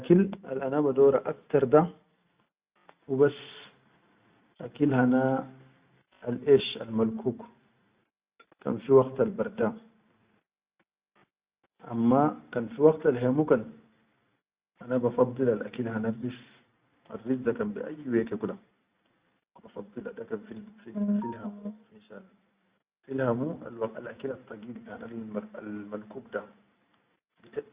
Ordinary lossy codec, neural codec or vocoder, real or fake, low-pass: Opus, 64 kbps; none; real; 3.6 kHz